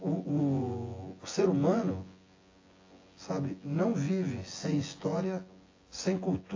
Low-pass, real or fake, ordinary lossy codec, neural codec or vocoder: 7.2 kHz; fake; AAC, 32 kbps; vocoder, 24 kHz, 100 mel bands, Vocos